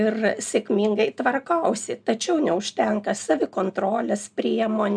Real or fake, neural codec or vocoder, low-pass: real; none; 9.9 kHz